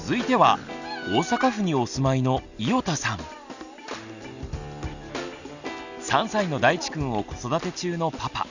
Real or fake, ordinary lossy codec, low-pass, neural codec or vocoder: real; none; 7.2 kHz; none